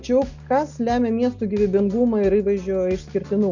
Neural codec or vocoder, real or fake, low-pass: none; real; 7.2 kHz